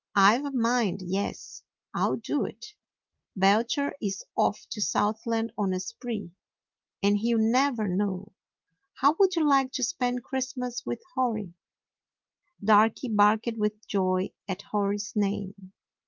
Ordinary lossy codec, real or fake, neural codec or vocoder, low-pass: Opus, 24 kbps; real; none; 7.2 kHz